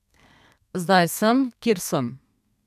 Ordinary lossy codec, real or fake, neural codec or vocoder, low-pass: none; fake; codec, 32 kHz, 1.9 kbps, SNAC; 14.4 kHz